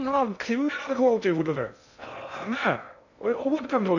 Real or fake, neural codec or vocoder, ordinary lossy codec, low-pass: fake; codec, 16 kHz in and 24 kHz out, 0.6 kbps, FocalCodec, streaming, 2048 codes; none; 7.2 kHz